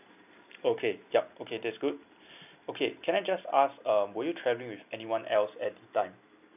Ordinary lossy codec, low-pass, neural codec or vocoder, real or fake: none; 3.6 kHz; none; real